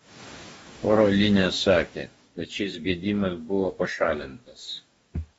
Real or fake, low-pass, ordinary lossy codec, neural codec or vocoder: fake; 19.8 kHz; AAC, 24 kbps; codec, 44.1 kHz, 2.6 kbps, DAC